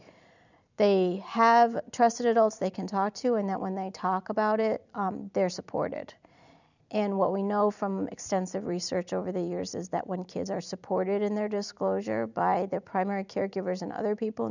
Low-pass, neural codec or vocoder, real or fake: 7.2 kHz; vocoder, 44.1 kHz, 128 mel bands every 256 samples, BigVGAN v2; fake